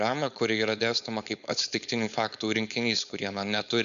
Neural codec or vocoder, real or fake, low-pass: codec, 16 kHz, 4.8 kbps, FACodec; fake; 7.2 kHz